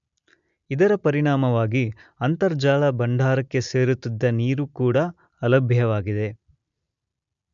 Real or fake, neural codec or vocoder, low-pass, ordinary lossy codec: real; none; 7.2 kHz; none